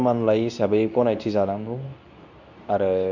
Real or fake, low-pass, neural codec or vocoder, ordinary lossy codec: fake; 7.2 kHz; codec, 24 kHz, 0.9 kbps, WavTokenizer, medium speech release version 2; none